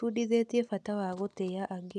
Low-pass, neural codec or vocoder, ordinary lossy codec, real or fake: none; none; none; real